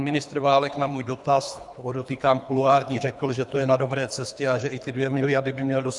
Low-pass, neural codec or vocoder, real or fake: 10.8 kHz; codec, 24 kHz, 3 kbps, HILCodec; fake